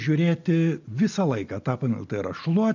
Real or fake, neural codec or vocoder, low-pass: real; none; 7.2 kHz